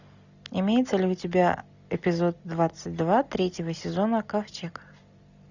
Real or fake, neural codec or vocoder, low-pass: real; none; 7.2 kHz